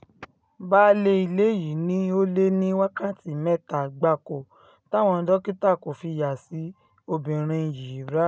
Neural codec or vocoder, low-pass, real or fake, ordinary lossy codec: none; none; real; none